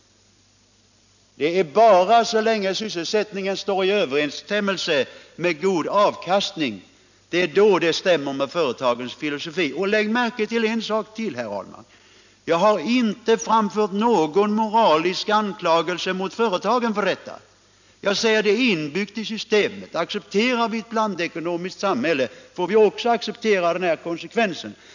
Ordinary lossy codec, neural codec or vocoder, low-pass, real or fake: none; none; 7.2 kHz; real